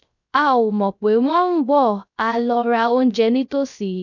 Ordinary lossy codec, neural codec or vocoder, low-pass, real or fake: none; codec, 16 kHz, 0.3 kbps, FocalCodec; 7.2 kHz; fake